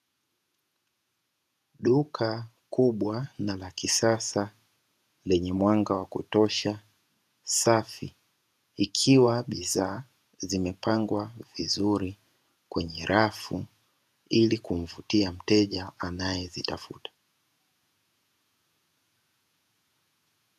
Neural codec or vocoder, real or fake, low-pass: vocoder, 48 kHz, 128 mel bands, Vocos; fake; 14.4 kHz